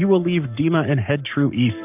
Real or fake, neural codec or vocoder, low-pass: fake; codec, 44.1 kHz, 7.8 kbps, Pupu-Codec; 3.6 kHz